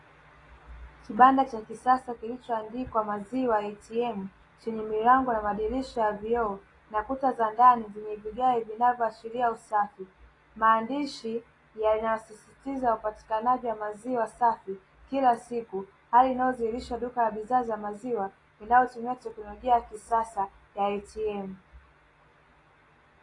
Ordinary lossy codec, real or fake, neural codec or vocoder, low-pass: AAC, 32 kbps; real; none; 10.8 kHz